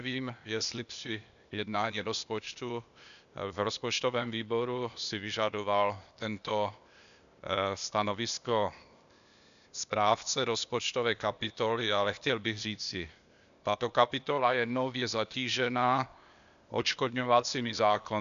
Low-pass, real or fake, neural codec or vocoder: 7.2 kHz; fake; codec, 16 kHz, 0.8 kbps, ZipCodec